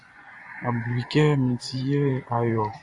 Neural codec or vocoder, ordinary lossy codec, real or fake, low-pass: vocoder, 24 kHz, 100 mel bands, Vocos; AAC, 64 kbps; fake; 10.8 kHz